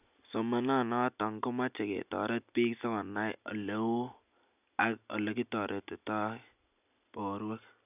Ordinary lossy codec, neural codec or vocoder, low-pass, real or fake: none; none; 3.6 kHz; real